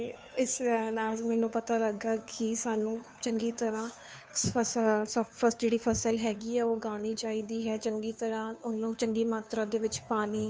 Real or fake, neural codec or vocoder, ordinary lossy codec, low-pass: fake; codec, 16 kHz, 2 kbps, FunCodec, trained on Chinese and English, 25 frames a second; none; none